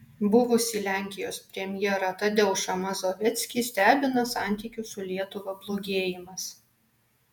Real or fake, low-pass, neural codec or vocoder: fake; 19.8 kHz; vocoder, 48 kHz, 128 mel bands, Vocos